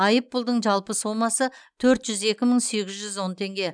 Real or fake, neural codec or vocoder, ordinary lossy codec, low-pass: real; none; none; 9.9 kHz